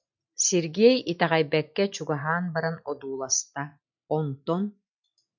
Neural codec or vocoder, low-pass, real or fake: none; 7.2 kHz; real